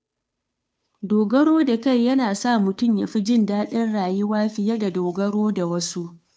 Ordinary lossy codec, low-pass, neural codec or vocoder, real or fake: none; none; codec, 16 kHz, 2 kbps, FunCodec, trained on Chinese and English, 25 frames a second; fake